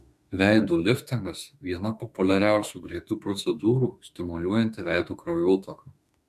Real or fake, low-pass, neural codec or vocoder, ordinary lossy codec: fake; 14.4 kHz; autoencoder, 48 kHz, 32 numbers a frame, DAC-VAE, trained on Japanese speech; MP3, 96 kbps